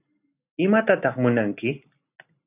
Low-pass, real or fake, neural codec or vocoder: 3.6 kHz; real; none